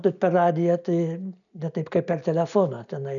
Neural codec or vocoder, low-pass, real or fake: none; 7.2 kHz; real